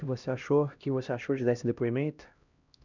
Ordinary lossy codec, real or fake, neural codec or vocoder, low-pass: none; fake; codec, 16 kHz, 1 kbps, X-Codec, HuBERT features, trained on LibriSpeech; 7.2 kHz